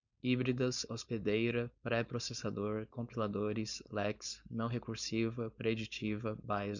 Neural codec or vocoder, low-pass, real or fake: codec, 16 kHz, 4.8 kbps, FACodec; 7.2 kHz; fake